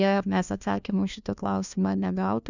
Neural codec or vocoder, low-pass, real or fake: codec, 16 kHz, 1 kbps, FunCodec, trained on LibriTTS, 50 frames a second; 7.2 kHz; fake